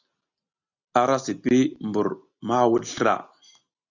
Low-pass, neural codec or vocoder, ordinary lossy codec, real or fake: 7.2 kHz; none; Opus, 64 kbps; real